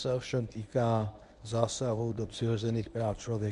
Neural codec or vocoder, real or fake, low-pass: codec, 24 kHz, 0.9 kbps, WavTokenizer, medium speech release version 1; fake; 10.8 kHz